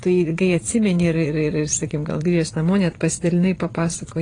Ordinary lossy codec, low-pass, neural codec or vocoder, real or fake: AAC, 32 kbps; 9.9 kHz; vocoder, 22.05 kHz, 80 mel bands, Vocos; fake